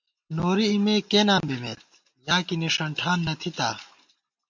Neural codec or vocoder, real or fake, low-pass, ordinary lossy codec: none; real; 7.2 kHz; MP3, 64 kbps